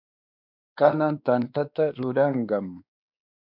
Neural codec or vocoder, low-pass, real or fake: codec, 16 kHz, 2 kbps, X-Codec, WavLM features, trained on Multilingual LibriSpeech; 5.4 kHz; fake